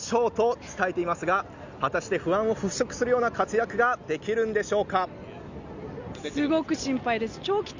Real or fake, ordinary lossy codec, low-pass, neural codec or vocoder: real; Opus, 64 kbps; 7.2 kHz; none